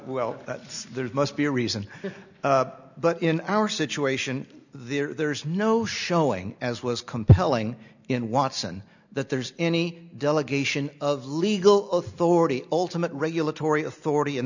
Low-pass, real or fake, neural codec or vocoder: 7.2 kHz; real; none